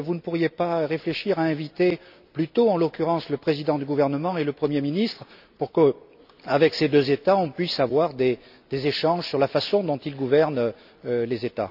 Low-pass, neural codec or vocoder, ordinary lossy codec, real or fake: 5.4 kHz; none; none; real